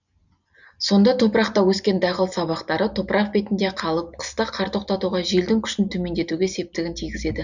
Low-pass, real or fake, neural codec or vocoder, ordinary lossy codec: 7.2 kHz; real; none; none